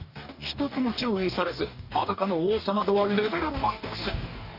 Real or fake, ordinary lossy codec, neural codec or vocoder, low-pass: fake; none; codec, 44.1 kHz, 2.6 kbps, DAC; 5.4 kHz